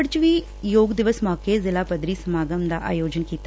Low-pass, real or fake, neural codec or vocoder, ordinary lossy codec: none; real; none; none